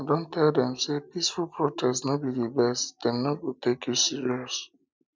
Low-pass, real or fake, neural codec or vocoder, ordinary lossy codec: none; real; none; none